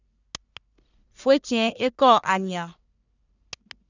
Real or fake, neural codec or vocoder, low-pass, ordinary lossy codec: fake; codec, 44.1 kHz, 1.7 kbps, Pupu-Codec; 7.2 kHz; none